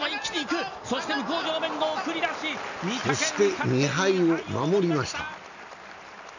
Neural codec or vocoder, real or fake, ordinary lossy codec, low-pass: none; real; none; 7.2 kHz